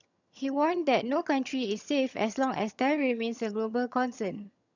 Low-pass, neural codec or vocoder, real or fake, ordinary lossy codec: 7.2 kHz; vocoder, 22.05 kHz, 80 mel bands, HiFi-GAN; fake; none